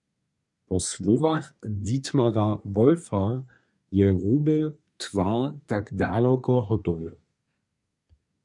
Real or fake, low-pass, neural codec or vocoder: fake; 10.8 kHz; codec, 24 kHz, 1 kbps, SNAC